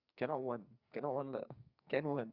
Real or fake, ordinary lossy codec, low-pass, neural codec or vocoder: fake; Opus, 64 kbps; 5.4 kHz; codec, 44.1 kHz, 2.6 kbps, SNAC